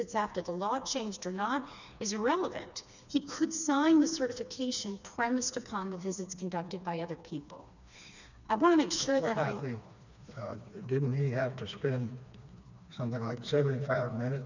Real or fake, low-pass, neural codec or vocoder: fake; 7.2 kHz; codec, 16 kHz, 2 kbps, FreqCodec, smaller model